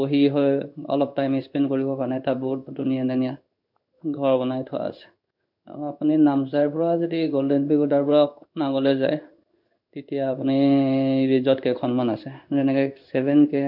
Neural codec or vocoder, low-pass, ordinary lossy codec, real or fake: codec, 16 kHz in and 24 kHz out, 1 kbps, XY-Tokenizer; 5.4 kHz; none; fake